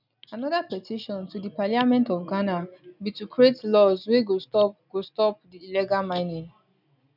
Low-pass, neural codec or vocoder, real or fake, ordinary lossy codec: 5.4 kHz; none; real; none